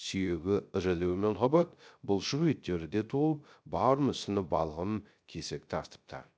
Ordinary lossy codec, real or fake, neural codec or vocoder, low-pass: none; fake; codec, 16 kHz, 0.3 kbps, FocalCodec; none